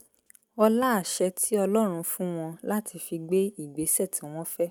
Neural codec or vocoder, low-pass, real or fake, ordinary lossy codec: none; none; real; none